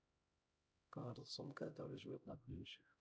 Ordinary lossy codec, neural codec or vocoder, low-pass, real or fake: none; codec, 16 kHz, 0.5 kbps, X-Codec, HuBERT features, trained on LibriSpeech; none; fake